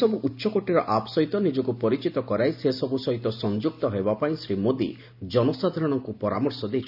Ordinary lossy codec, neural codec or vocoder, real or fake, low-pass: none; none; real; 5.4 kHz